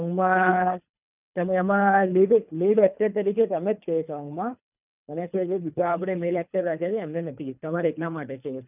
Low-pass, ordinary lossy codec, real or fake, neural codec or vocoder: 3.6 kHz; none; fake; codec, 24 kHz, 3 kbps, HILCodec